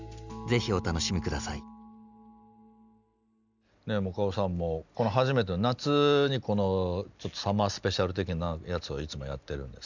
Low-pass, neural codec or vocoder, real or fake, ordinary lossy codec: 7.2 kHz; none; real; none